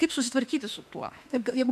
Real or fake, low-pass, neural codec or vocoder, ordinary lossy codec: fake; 14.4 kHz; autoencoder, 48 kHz, 32 numbers a frame, DAC-VAE, trained on Japanese speech; AAC, 96 kbps